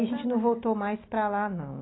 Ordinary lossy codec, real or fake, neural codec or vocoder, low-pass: AAC, 16 kbps; real; none; 7.2 kHz